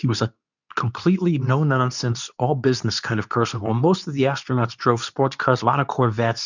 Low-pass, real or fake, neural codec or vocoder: 7.2 kHz; fake; codec, 24 kHz, 0.9 kbps, WavTokenizer, medium speech release version 2